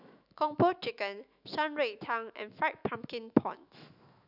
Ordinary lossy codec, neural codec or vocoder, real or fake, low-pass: none; autoencoder, 48 kHz, 128 numbers a frame, DAC-VAE, trained on Japanese speech; fake; 5.4 kHz